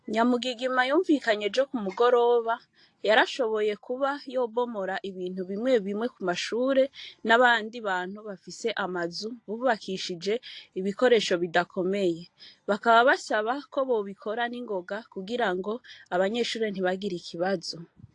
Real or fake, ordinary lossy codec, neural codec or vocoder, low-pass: real; AAC, 48 kbps; none; 10.8 kHz